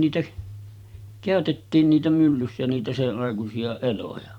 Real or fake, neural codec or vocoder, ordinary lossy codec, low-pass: fake; vocoder, 44.1 kHz, 128 mel bands every 512 samples, BigVGAN v2; none; 19.8 kHz